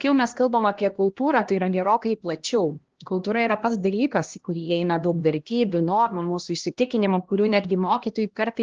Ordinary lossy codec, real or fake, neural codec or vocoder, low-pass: Opus, 16 kbps; fake; codec, 16 kHz, 1 kbps, X-Codec, HuBERT features, trained on LibriSpeech; 7.2 kHz